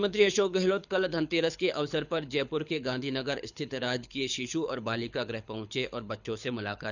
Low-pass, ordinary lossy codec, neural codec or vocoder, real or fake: 7.2 kHz; none; codec, 24 kHz, 6 kbps, HILCodec; fake